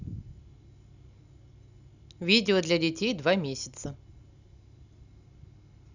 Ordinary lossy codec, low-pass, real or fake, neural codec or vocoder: none; 7.2 kHz; real; none